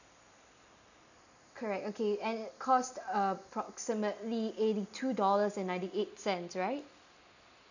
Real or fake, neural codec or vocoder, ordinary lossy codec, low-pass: real; none; none; 7.2 kHz